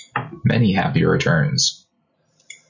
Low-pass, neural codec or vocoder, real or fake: 7.2 kHz; none; real